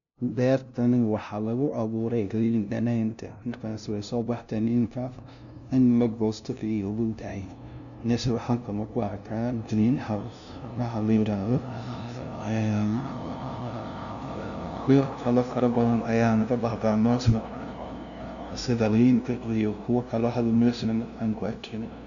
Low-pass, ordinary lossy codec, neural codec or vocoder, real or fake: 7.2 kHz; none; codec, 16 kHz, 0.5 kbps, FunCodec, trained on LibriTTS, 25 frames a second; fake